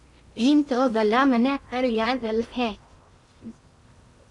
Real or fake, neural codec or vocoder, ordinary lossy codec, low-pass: fake; codec, 16 kHz in and 24 kHz out, 0.8 kbps, FocalCodec, streaming, 65536 codes; Opus, 64 kbps; 10.8 kHz